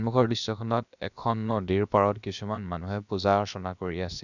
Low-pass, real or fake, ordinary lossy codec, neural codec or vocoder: 7.2 kHz; fake; none; codec, 16 kHz, about 1 kbps, DyCAST, with the encoder's durations